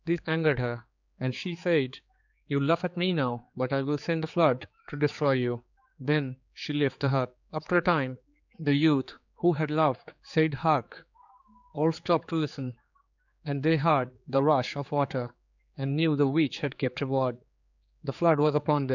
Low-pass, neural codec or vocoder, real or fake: 7.2 kHz; codec, 16 kHz, 4 kbps, X-Codec, HuBERT features, trained on general audio; fake